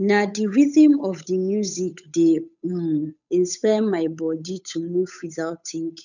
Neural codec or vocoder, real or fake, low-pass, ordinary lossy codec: codec, 16 kHz, 8 kbps, FunCodec, trained on Chinese and English, 25 frames a second; fake; 7.2 kHz; none